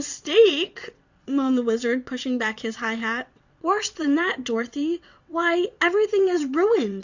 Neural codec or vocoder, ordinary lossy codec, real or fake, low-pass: vocoder, 22.05 kHz, 80 mel bands, WaveNeXt; Opus, 64 kbps; fake; 7.2 kHz